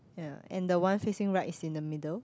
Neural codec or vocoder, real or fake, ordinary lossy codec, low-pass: none; real; none; none